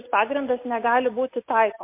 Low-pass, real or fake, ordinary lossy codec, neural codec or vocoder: 3.6 kHz; real; MP3, 24 kbps; none